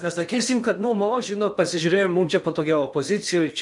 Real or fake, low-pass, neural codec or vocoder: fake; 10.8 kHz; codec, 16 kHz in and 24 kHz out, 0.8 kbps, FocalCodec, streaming, 65536 codes